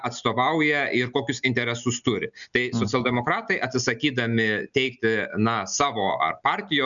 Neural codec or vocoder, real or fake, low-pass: none; real; 7.2 kHz